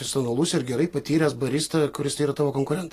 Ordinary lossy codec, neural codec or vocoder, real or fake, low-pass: AAC, 48 kbps; none; real; 14.4 kHz